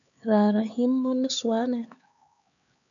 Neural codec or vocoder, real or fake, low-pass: codec, 16 kHz, 4 kbps, X-Codec, HuBERT features, trained on LibriSpeech; fake; 7.2 kHz